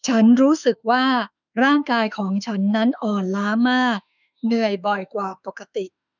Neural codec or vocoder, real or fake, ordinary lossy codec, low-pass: autoencoder, 48 kHz, 32 numbers a frame, DAC-VAE, trained on Japanese speech; fake; none; 7.2 kHz